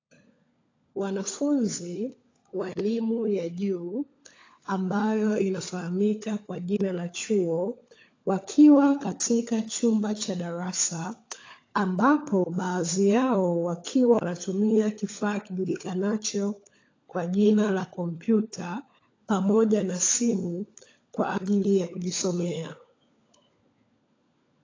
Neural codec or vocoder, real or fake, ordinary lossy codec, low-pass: codec, 16 kHz, 16 kbps, FunCodec, trained on LibriTTS, 50 frames a second; fake; AAC, 32 kbps; 7.2 kHz